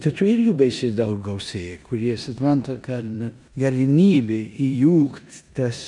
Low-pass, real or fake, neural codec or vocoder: 10.8 kHz; fake; codec, 16 kHz in and 24 kHz out, 0.9 kbps, LongCat-Audio-Codec, four codebook decoder